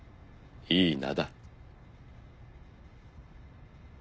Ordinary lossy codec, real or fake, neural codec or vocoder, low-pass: none; real; none; none